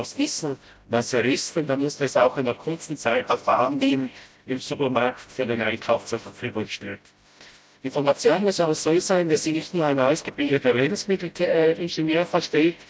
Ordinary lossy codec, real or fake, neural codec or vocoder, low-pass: none; fake; codec, 16 kHz, 0.5 kbps, FreqCodec, smaller model; none